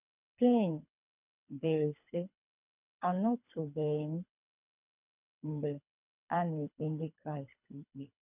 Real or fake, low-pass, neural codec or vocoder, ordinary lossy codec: fake; 3.6 kHz; codec, 24 kHz, 3 kbps, HILCodec; none